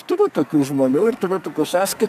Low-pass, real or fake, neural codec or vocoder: 14.4 kHz; fake; codec, 44.1 kHz, 2.6 kbps, SNAC